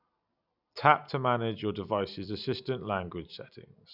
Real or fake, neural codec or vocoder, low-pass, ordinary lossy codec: real; none; 5.4 kHz; none